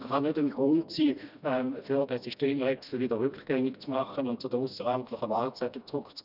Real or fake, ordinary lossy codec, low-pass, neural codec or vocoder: fake; none; 5.4 kHz; codec, 16 kHz, 1 kbps, FreqCodec, smaller model